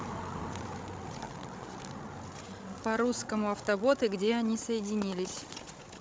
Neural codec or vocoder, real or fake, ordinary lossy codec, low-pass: codec, 16 kHz, 16 kbps, FreqCodec, larger model; fake; none; none